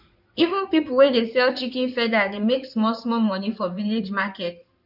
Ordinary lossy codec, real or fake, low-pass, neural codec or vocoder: none; fake; 5.4 kHz; codec, 16 kHz in and 24 kHz out, 2.2 kbps, FireRedTTS-2 codec